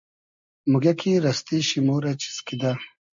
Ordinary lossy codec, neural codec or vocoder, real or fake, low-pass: MP3, 64 kbps; none; real; 7.2 kHz